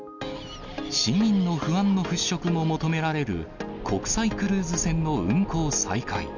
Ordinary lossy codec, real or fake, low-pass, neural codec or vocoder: none; real; 7.2 kHz; none